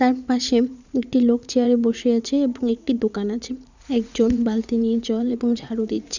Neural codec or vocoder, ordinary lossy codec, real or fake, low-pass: vocoder, 44.1 kHz, 80 mel bands, Vocos; none; fake; 7.2 kHz